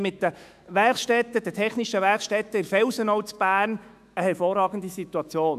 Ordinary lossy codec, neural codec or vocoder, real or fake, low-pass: none; autoencoder, 48 kHz, 128 numbers a frame, DAC-VAE, trained on Japanese speech; fake; 14.4 kHz